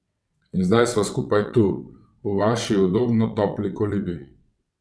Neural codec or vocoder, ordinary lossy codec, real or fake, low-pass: vocoder, 22.05 kHz, 80 mel bands, WaveNeXt; none; fake; none